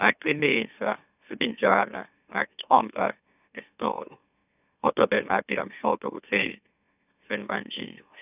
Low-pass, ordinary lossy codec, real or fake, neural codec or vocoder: 3.6 kHz; none; fake; autoencoder, 44.1 kHz, a latent of 192 numbers a frame, MeloTTS